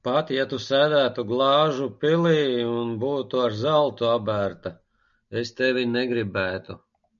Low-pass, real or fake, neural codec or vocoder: 7.2 kHz; real; none